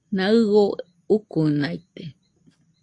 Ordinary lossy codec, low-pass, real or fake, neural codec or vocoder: AAC, 48 kbps; 10.8 kHz; real; none